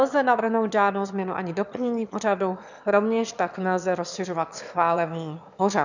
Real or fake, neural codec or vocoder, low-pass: fake; autoencoder, 22.05 kHz, a latent of 192 numbers a frame, VITS, trained on one speaker; 7.2 kHz